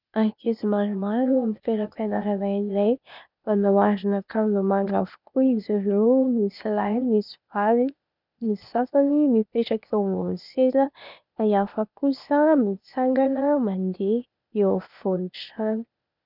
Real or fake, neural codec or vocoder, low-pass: fake; codec, 16 kHz, 0.8 kbps, ZipCodec; 5.4 kHz